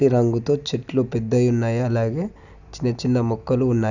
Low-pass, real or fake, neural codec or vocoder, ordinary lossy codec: 7.2 kHz; real; none; none